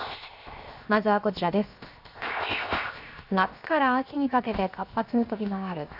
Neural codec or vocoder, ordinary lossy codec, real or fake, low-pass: codec, 16 kHz, 0.7 kbps, FocalCodec; none; fake; 5.4 kHz